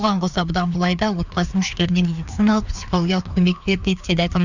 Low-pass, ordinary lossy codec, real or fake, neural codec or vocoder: 7.2 kHz; none; fake; codec, 16 kHz, 2 kbps, FreqCodec, larger model